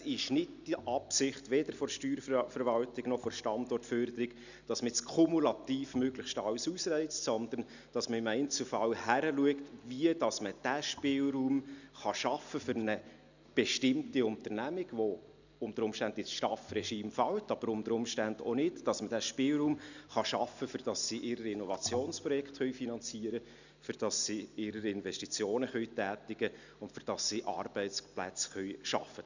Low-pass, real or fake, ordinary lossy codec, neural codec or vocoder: 7.2 kHz; real; none; none